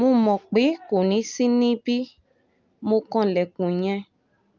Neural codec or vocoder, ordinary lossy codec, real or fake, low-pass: none; Opus, 24 kbps; real; 7.2 kHz